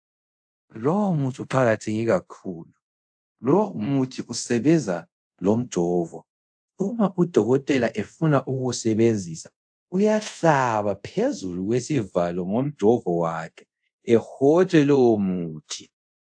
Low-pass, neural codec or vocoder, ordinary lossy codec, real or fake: 9.9 kHz; codec, 24 kHz, 0.5 kbps, DualCodec; AAC, 64 kbps; fake